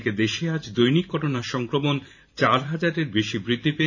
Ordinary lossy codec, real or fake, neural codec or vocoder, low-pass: none; fake; vocoder, 44.1 kHz, 128 mel bands every 512 samples, BigVGAN v2; 7.2 kHz